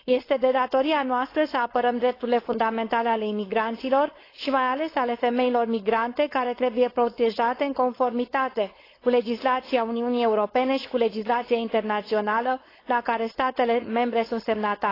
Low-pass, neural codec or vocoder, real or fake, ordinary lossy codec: 5.4 kHz; codec, 16 kHz, 4.8 kbps, FACodec; fake; AAC, 24 kbps